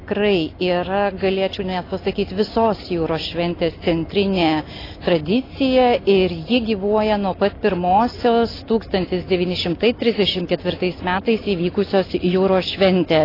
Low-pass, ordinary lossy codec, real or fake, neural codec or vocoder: 5.4 kHz; AAC, 24 kbps; real; none